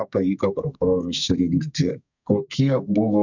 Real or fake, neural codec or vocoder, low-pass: fake; codec, 32 kHz, 1.9 kbps, SNAC; 7.2 kHz